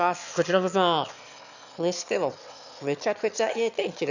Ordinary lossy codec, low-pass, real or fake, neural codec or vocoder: none; 7.2 kHz; fake; autoencoder, 22.05 kHz, a latent of 192 numbers a frame, VITS, trained on one speaker